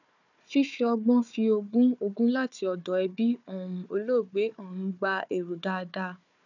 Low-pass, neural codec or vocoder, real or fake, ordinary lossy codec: 7.2 kHz; codec, 16 kHz, 4 kbps, FunCodec, trained on Chinese and English, 50 frames a second; fake; none